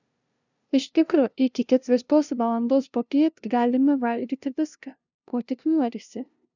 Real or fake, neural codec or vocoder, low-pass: fake; codec, 16 kHz, 0.5 kbps, FunCodec, trained on LibriTTS, 25 frames a second; 7.2 kHz